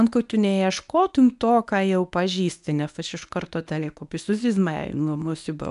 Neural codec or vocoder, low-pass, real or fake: codec, 24 kHz, 0.9 kbps, WavTokenizer, medium speech release version 1; 10.8 kHz; fake